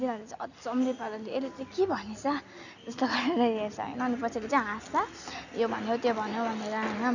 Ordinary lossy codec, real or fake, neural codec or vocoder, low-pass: none; real; none; 7.2 kHz